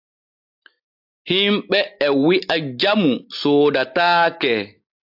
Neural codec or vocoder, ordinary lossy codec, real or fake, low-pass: none; AAC, 48 kbps; real; 5.4 kHz